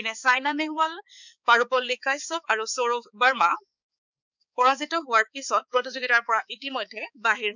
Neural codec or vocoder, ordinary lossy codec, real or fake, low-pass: codec, 16 kHz, 4 kbps, X-Codec, HuBERT features, trained on balanced general audio; none; fake; 7.2 kHz